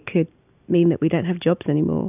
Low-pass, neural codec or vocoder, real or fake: 3.6 kHz; none; real